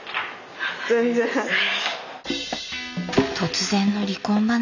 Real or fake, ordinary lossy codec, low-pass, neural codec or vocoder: real; none; 7.2 kHz; none